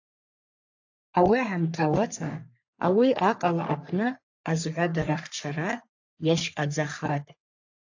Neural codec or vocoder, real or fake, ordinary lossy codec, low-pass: codec, 44.1 kHz, 3.4 kbps, Pupu-Codec; fake; AAC, 48 kbps; 7.2 kHz